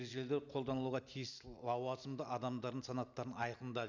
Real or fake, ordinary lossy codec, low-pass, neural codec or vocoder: real; none; 7.2 kHz; none